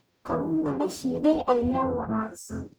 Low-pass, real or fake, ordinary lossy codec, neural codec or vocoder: none; fake; none; codec, 44.1 kHz, 0.9 kbps, DAC